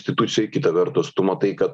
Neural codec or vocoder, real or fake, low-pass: none; real; 9.9 kHz